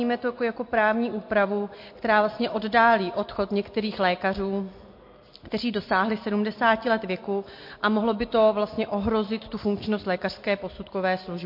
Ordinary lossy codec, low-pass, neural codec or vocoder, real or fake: MP3, 32 kbps; 5.4 kHz; none; real